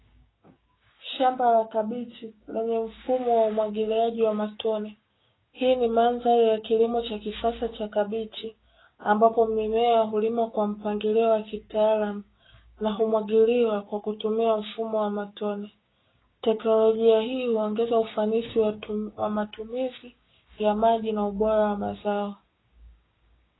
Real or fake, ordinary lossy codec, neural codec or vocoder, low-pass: fake; AAC, 16 kbps; codec, 16 kHz, 6 kbps, DAC; 7.2 kHz